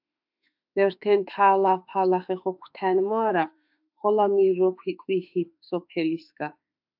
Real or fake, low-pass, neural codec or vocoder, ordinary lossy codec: fake; 5.4 kHz; autoencoder, 48 kHz, 32 numbers a frame, DAC-VAE, trained on Japanese speech; AAC, 48 kbps